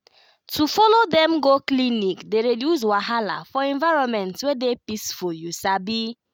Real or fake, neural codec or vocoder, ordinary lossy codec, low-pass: real; none; none; none